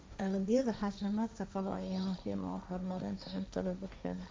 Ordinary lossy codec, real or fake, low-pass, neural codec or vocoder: none; fake; none; codec, 16 kHz, 1.1 kbps, Voila-Tokenizer